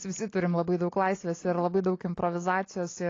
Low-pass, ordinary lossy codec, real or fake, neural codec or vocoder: 7.2 kHz; AAC, 32 kbps; real; none